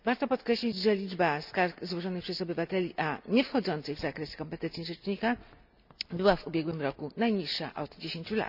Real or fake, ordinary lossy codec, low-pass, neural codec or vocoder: real; none; 5.4 kHz; none